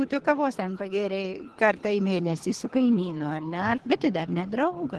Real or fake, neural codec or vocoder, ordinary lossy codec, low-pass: fake; codec, 24 kHz, 3 kbps, HILCodec; Opus, 16 kbps; 10.8 kHz